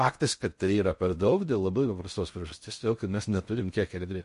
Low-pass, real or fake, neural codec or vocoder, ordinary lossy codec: 10.8 kHz; fake; codec, 16 kHz in and 24 kHz out, 0.6 kbps, FocalCodec, streaming, 4096 codes; MP3, 48 kbps